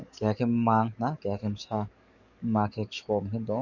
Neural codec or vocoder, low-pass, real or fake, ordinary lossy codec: codec, 44.1 kHz, 7.8 kbps, DAC; 7.2 kHz; fake; none